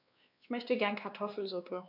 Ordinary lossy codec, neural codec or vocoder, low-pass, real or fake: none; codec, 16 kHz, 2 kbps, X-Codec, WavLM features, trained on Multilingual LibriSpeech; 5.4 kHz; fake